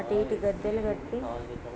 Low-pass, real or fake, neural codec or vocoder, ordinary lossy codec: none; real; none; none